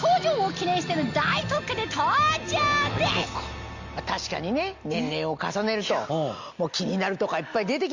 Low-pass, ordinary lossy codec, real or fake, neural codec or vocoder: 7.2 kHz; Opus, 64 kbps; real; none